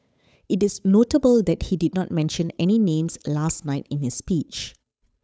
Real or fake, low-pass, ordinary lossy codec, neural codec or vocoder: fake; none; none; codec, 16 kHz, 8 kbps, FunCodec, trained on Chinese and English, 25 frames a second